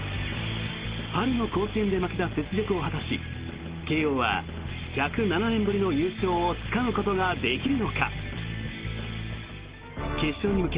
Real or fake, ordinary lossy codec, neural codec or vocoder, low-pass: real; Opus, 16 kbps; none; 3.6 kHz